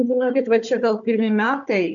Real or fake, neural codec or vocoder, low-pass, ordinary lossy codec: fake; codec, 16 kHz, 4 kbps, FunCodec, trained on Chinese and English, 50 frames a second; 7.2 kHz; MP3, 64 kbps